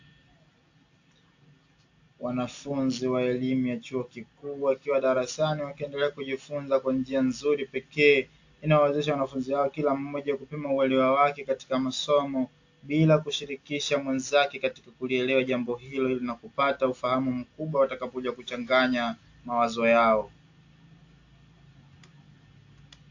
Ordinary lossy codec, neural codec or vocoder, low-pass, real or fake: AAC, 48 kbps; none; 7.2 kHz; real